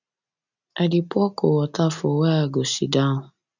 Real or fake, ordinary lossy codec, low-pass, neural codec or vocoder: real; none; 7.2 kHz; none